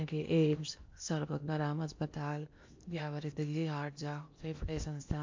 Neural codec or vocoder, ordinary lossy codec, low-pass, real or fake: codec, 16 kHz in and 24 kHz out, 0.8 kbps, FocalCodec, streaming, 65536 codes; MP3, 48 kbps; 7.2 kHz; fake